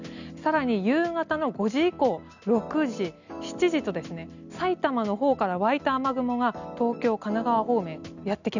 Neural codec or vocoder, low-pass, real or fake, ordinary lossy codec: none; 7.2 kHz; real; none